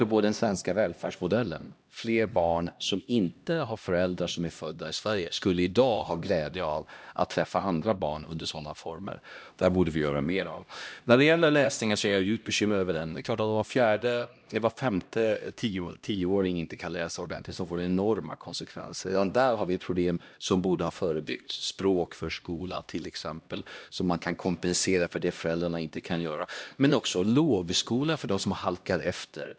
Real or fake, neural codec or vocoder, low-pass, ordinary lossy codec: fake; codec, 16 kHz, 1 kbps, X-Codec, HuBERT features, trained on LibriSpeech; none; none